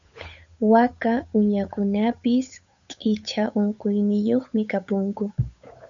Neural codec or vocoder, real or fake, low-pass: codec, 16 kHz, 8 kbps, FunCodec, trained on Chinese and English, 25 frames a second; fake; 7.2 kHz